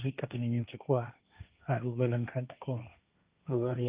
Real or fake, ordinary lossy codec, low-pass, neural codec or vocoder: fake; Opus, 24 kbps; 3.6 kHz; codec, 16 kHz, 1.1 kbps, Voila-Tokenizer